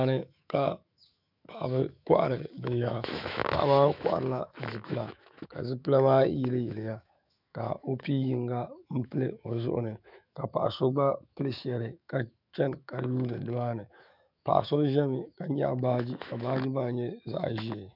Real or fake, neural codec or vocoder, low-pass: fake; autoencoder, 48 kHz, 128 numbers a frame, DAC-VAE, trained on Japanese speech; 5.4 kHz